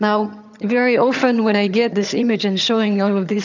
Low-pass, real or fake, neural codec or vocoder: 7.2 kHz; fake; vocoder, 22.05 kHz, 80 mel bands, HiFi-GAN